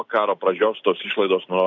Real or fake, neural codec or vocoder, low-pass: real; none; 7.2 kHz